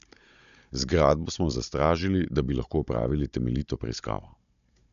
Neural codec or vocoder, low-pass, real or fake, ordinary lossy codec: codec, 16 kHz, 16 kbps, FunCodec, trained on Chinese and English, 50 frames a second; 7.2 kHz; fake; MP3, 96 kbps